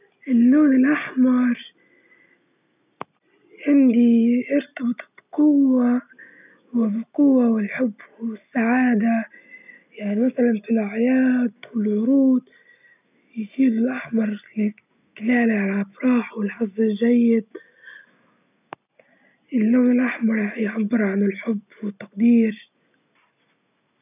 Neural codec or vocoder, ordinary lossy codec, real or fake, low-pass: none; none; real; 3.6 kHz